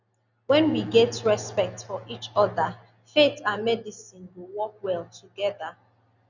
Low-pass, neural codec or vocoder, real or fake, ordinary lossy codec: 7.2 kHz; none; real; none